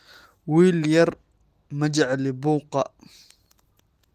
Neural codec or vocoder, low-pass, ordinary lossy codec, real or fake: none; 14.4 kHz; Opus, 24 kbps; real